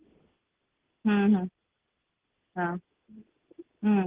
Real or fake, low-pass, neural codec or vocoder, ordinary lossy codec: real; 3.6 kHz; none; Opus, 16 kbps